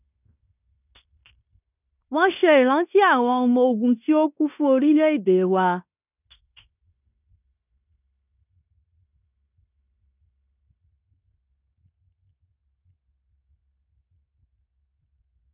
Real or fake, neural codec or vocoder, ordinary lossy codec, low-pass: fake; codec, 16 kHz in and 24 kHz out, 0.9 kbps, LongCat-Audio-Codec, fine tuned four codebook decoder; none; 3.6 kHz